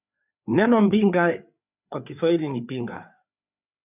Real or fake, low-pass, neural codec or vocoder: fake; 3.6 kHz; codec, 16 kHz, 4 kbps, FreqCodec, larger model